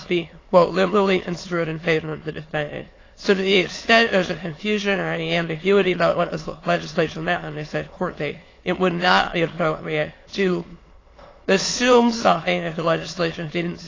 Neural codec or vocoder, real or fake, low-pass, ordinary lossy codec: autoencoder, 22.05 kHz, a latent of 192 numbers a frame, VITS, trained on many speakers; fake; 7.2 kHz; AAC, 32 kbps